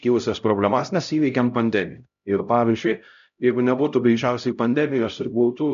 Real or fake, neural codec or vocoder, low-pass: fake; codec, 16 kHz, 0.5 kbps, X-Codec, HuBERT features, trained on LibriSpeech; 7.2 kHz